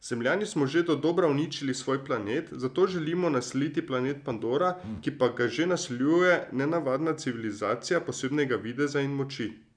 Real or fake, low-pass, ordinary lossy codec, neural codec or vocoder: real; 9.9 kHz; none; none